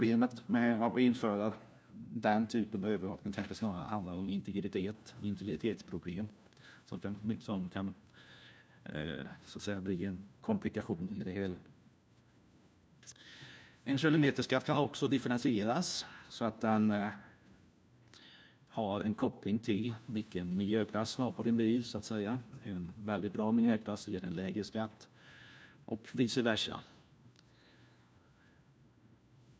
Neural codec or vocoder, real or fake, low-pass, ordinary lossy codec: codec, 16 kHz, 1 kbps, FunCodec, trained on LibriTTS, 50 frames a second; fake; none; none